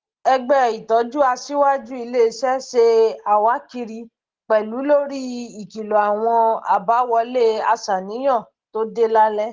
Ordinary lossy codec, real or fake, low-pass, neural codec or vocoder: Opus, 16 kbps; real; 7.2 kHz; none